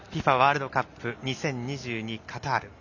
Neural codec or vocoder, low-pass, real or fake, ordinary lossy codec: none; 7.2 kHz; real; none